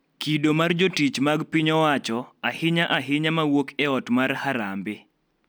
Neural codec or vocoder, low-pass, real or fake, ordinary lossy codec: none; none; real; none